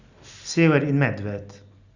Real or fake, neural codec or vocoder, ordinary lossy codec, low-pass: real; none; Opus, 64 kbps; 7.2 kHz